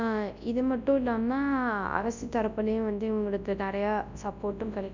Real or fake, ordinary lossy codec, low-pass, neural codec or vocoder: fake; none; 7.2 kHz; codec, 24 kHz, 0.9 kbps, WavTokenizer, large speech release